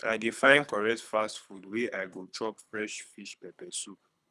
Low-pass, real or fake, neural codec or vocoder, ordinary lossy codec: none; fake; codec, 24 kHz, 3 kbps, HILCodec; none